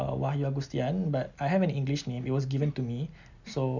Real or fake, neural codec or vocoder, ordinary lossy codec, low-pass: real; none; none; 7.2 kHz